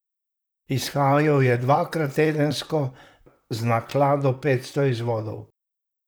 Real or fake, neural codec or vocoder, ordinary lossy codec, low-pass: fake; vocoder, 44.1 kHz, 128 mel bands, Pupu-Vocoder; none; none